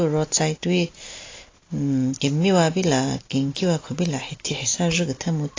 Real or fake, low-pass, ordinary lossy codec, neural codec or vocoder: real; 7.2 kHz; AAC, 32 kbps; none